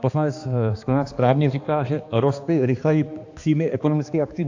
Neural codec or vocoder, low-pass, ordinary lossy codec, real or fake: codec, 16 kHz, 2 kbps, X-Codec, HuBERT features, trained on balanced general audio; 7.2 kHz; AAC, 48 kbps; fake